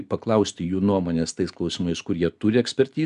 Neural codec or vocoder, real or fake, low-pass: none; real; 10.8 kHz